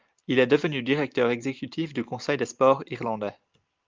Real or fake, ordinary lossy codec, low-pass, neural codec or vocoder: real; Opus, 32 kbps; 7.2 kHz; none